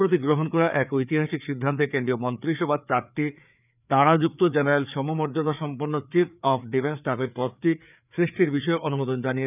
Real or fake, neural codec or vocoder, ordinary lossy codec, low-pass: fake; codec, 16 kHz, 4 kbps, FreqCodec, larger model; none; 3.6 kHz